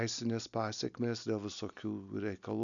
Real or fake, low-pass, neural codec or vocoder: real; 7.2 kHz; none